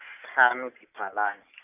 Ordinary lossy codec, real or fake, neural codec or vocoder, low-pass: none; real; none; 3.6 kHz